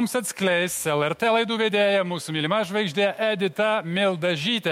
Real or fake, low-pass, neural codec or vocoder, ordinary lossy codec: fake; 19.8 kHz; autoencoder, 48 kHz, 128 numbers a frame, DAC-VAE, trained on Japanese speech; MP3, 64 kbps